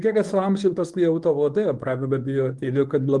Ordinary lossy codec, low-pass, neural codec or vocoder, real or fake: Opus, 24 kbps; 10.8 kHz; codec, 24 kHz, 0.9 kbps, WavTokenizer, medium speech release version 1; fake